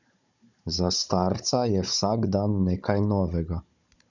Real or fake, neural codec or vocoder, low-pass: fake; codec, 16 kHz, 16 kbps, FunCodec, trained on Chinese and English, 50 frames a second; 7.2 kHz